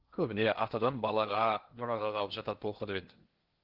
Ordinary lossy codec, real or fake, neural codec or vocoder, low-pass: Opus, 16 kbps; fake; codec, 16 kHz in and 24 kHz out, 0.8 kbps, FocalCodec, streaming, 65536 codes; 5.4 kHz